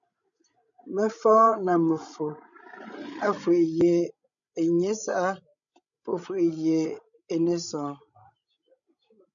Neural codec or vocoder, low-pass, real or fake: codec, 16 kHz, 16 kbps, FreqCodec, larger model; 7.2 kHz; fake